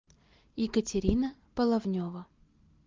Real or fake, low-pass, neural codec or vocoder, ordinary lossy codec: real; 7.2 kHz; none; Opus, 32 kbps